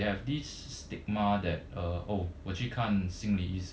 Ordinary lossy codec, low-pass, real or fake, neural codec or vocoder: none; none; real; none